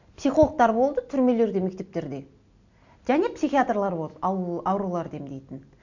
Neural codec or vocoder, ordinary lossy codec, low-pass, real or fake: none; none; 7.2 kHz; real